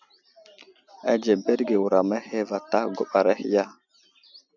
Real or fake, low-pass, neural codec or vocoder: real; 7.2 kHz; none